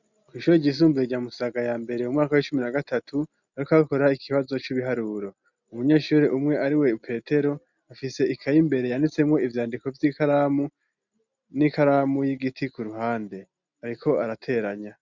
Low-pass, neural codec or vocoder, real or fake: 7.2 kHz; none; real